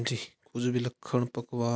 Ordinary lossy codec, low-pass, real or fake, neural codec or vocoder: none; none; real; none